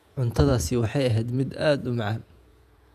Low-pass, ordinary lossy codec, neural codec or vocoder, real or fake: 14.4 kHz; none; vocoder, 48 kHz, 128 mel bands, Vocos; fake